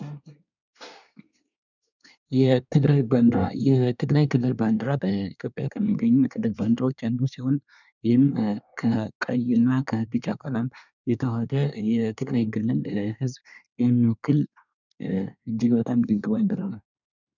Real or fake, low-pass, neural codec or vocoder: fake; 7.2 kHz; codec, 24 kHz, 1 kbps, SNAC